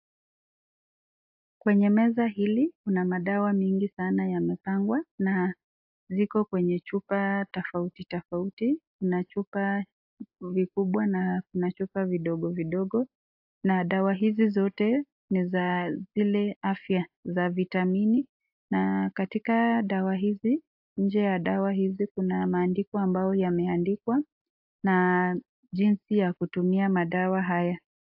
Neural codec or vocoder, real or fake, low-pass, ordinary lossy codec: none; real; 5.4 kHz; AAC, 48 kbps